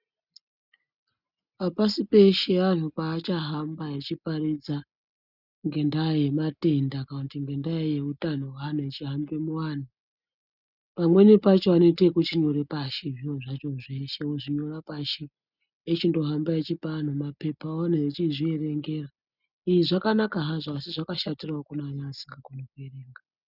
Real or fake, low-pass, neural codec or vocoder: real; 5.4 kHz; none